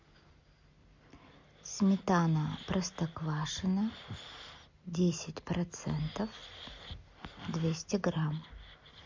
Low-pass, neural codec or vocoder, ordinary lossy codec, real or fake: 7.2 kHz; none; MP3, 48 kbps; real